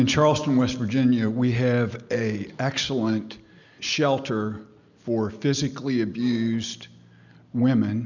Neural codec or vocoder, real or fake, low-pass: none; real; 7.2 kHz